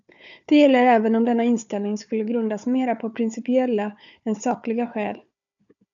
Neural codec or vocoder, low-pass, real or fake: codec, 16 kHz, 4 kbps, FunCodec, trained on Chinese and English, 50 frames a second; 7.2 kHz; fake